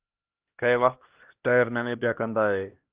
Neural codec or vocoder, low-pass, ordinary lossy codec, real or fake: codec, 16 kHz, 1 kbps, X-Codec, HuBERT features, trained on LibriSpeech; 3.6 kHz; Opus, 32 kbps; fake